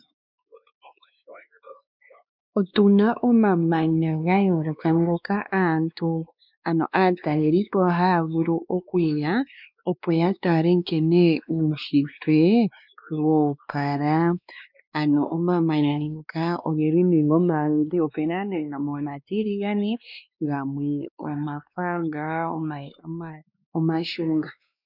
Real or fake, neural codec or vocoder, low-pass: fake; codec, 16 kHz, 2 kbps, X-Codec, WavLM features, trained on Multilingual LibriSpeech; 5.4 kHz